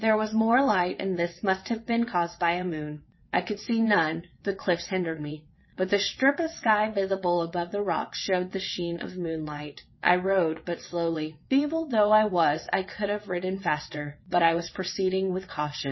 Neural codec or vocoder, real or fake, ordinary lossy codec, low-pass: vocoder, 22.05 kHz, 80 mel bands, WaveNeXt; fake; MP3, 24 kbps; 7.2 kHz